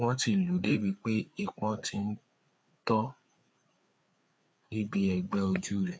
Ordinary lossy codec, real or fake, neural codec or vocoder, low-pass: none; fake; codec, 16 kHz, 4 kbps, FreqCodec, larger model; none